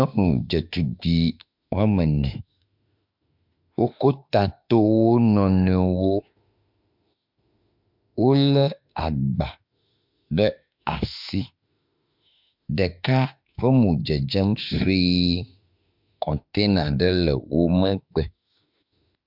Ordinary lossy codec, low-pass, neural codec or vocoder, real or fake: MP3, 48 kbps; 5.4 kHz; autoencoder, 48 kHz, 32 numbers a frame, DAC-VAE, trained on Japanese speech; fake